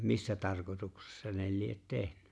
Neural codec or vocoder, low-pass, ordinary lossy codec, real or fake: none; none; none; real